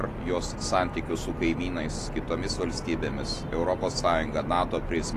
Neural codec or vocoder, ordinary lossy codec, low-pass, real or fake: none; AAC, 48 kbps; 14.4 kHz; real